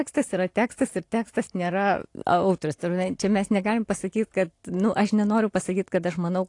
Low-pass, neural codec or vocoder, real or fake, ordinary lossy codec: 10.8 kHz; none; real; AAC, 48 kbps